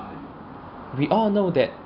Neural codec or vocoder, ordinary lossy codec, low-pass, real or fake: none; none; 5.4 kHz; real